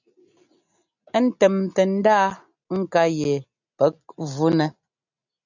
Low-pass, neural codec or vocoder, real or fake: 7.2 kHz; none; real